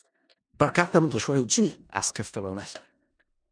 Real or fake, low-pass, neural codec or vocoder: fake; 9.9 kHz; codec, 16 kHz in and 24 kHz out, 0.4 kbps, LongCat-Audio-Codec, four codebook decoder